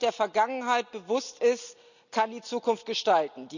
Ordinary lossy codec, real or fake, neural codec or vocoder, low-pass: none; real; none; 7.2 kHz